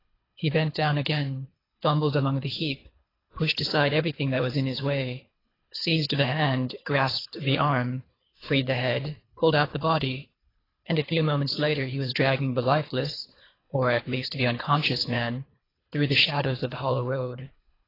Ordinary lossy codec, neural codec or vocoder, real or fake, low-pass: AAC, 24 kbps; codec, 24 kHz, 3 kbps, HILCodec; fake; 5.4 kHz